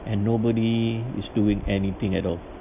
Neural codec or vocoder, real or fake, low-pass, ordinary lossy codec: none; real; 3.6 kHz; none